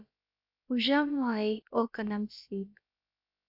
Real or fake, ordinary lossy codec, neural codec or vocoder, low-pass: fake; AAC, 48 kbps; codec, 16 kHz, about 1 kbps, DyCAST, with the encoder's durations; 5.4 kHz